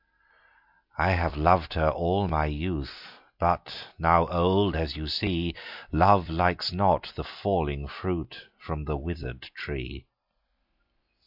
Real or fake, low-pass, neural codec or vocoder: real; 5.4 kHz; none